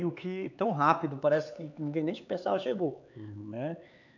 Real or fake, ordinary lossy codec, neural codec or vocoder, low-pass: fake; none; codec, 16 kHz, 4 kbps, X-Codec, HuBERT features, trained on LibriSpeech; 7.2 kHz